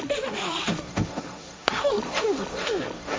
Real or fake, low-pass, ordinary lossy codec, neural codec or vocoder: fake; none; none; codec, 16 kHz, 1.1 kbps, Voila-Tokenizer